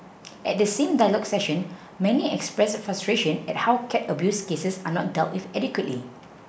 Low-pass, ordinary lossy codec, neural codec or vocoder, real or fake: none; none; none; real